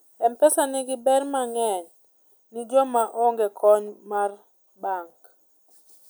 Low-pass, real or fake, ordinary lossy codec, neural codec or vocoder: none; real; none; none